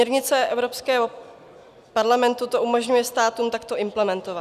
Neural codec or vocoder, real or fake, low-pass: none; real; 14.4 kHz